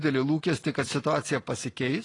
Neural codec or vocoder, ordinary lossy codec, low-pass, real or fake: vocoder, 44.1 kHz, 128 mel bands every 256 samples, BigVGAN v2; AAC, 32 kbps; 10.8 kHz; fake